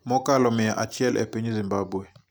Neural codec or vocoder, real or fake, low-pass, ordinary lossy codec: none; real; none; none